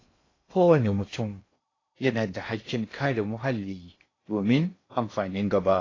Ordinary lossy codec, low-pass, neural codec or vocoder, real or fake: AAC, 32 kbps; 7.2 kHz; codec, 16 kHz in and 24 kHz out, 0.6 kbps, FocalCodec, streaming, 2048 codes; fake